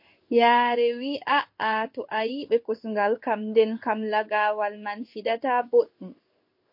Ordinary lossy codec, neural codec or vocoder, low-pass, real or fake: MP3, 32 kbps; codec, 16 kHz in and 24 kHz out, 1 kbps, XY-Tokenizer; 5.4 kHz; fake